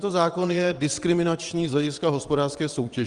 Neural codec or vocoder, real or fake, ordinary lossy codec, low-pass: vocoder, 22.05 kHz, 80 mel bands, WaveNeXt; fake; Opus, 24 kbps; 9.9 kHz